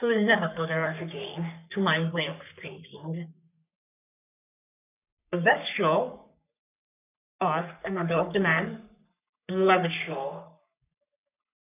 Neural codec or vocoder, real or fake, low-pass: codec, 44.1 kHz, 3.4 kbps, Pupu-Codec; fake; 3.6 kHz